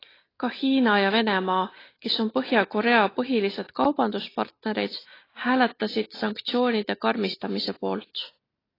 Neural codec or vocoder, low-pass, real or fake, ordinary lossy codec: none; 5.4 kHz; real; AAC, 24 kbps